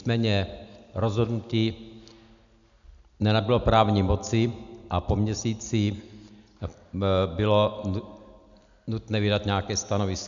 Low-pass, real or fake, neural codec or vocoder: 7.2 kHz; real; none